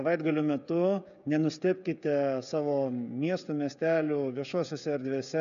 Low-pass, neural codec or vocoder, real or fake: 7.2 kHz; codec, 16 kHz, 16 kbps, FreqCodec, smaller model; fake